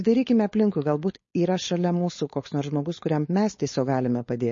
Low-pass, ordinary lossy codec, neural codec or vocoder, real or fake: 7.2 kHz; MP3, 32 kbps; codec, 16 kHz, 4.8 kbps, FACodec; fake